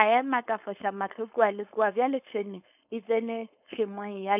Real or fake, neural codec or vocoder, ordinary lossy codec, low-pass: fake; codec, 16 kHz, 4.8 kbps, FACodec; none; 3.6 kHz